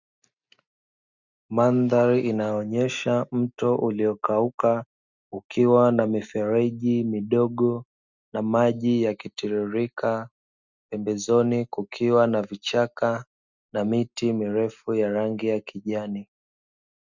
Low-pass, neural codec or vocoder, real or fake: 7.2 kHz; none; real